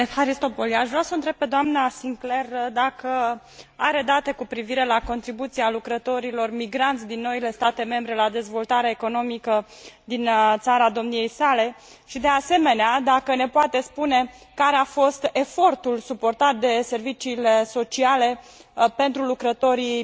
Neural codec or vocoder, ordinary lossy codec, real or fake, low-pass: none; none; real; none